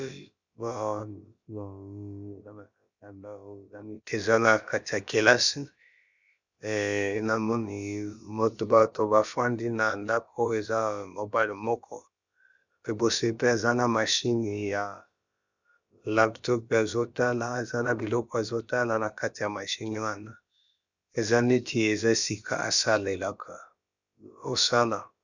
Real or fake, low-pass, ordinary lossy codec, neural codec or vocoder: fake; 7.2 kHz; Opus, 64 kbps; codec, 16 kHz, about 1 kbps, DyCAST, with the encoder's durations